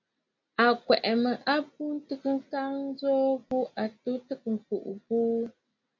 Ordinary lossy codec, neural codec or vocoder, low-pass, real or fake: MP3, 48 kbps; none; 7.2 kHz; real